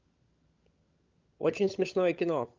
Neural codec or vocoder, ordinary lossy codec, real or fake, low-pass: codec, 16 kHz, 16 kbps, FunCodec, trained on LibriTTS, 50 frames a second; Opus, 24 kbps; fake; 7.2 kHz